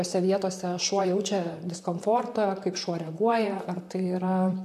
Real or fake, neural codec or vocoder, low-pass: fake; vocoder, 44.1 kHz, 128 mel bands, Pupu-Vocoder; 14.4 kHz